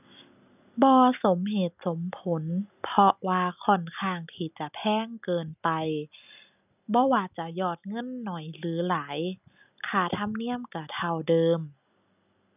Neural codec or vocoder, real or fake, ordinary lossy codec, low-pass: none; real; none; 3.6 kHz